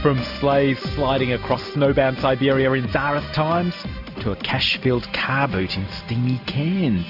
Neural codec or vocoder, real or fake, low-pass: none; real; 5.4 kHz